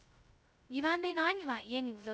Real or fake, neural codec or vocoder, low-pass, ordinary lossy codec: fake; codec, 16 kHz, 0.2 kbps, FocalCodec; none; none